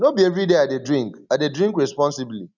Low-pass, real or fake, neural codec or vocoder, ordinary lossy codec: 7.2 kHz; real; none; none